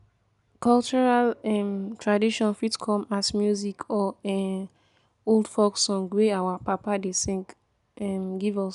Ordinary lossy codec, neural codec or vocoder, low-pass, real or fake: none; none; 10.8 kHz; real